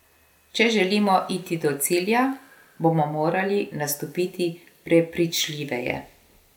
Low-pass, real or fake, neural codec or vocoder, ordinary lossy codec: 19.8 kHz; real; none; none